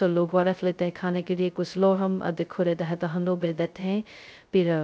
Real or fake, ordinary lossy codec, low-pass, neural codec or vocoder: fake; none; none; codec, 16 kHz, 0.2 kbps, FocalCodec